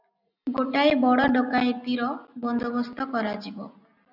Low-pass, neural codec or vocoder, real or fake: 5.4 kHz; none; real